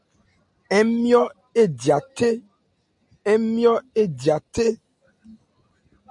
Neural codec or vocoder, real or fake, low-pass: none; real; 10.8 kHz